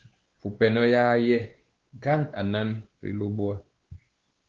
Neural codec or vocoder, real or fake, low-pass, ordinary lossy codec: codec, 16 kHz, 2 kbps, X-Codec, WavLM features, trained on Multilingual LibriSpeech; fake; 7.2 kHz; Opus, 16 kbps